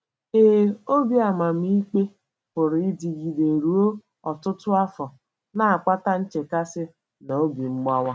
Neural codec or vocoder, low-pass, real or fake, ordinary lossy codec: none; none; real; none